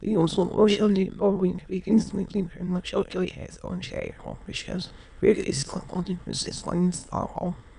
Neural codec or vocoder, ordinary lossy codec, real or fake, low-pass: autoencoder, 22.05 kHz, a latent of 192 numbers a frame, VITS, trained on many speakers; none; fake; 9.9 kHz